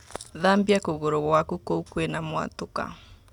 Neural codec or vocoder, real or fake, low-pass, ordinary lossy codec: none; real; 19.8 kHz; none